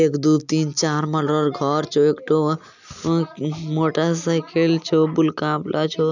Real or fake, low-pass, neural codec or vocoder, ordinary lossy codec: real; 7.2 kHz; none; none